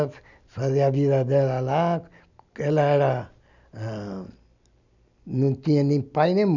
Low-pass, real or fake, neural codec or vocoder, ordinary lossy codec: 7.2 kHz; real; none; none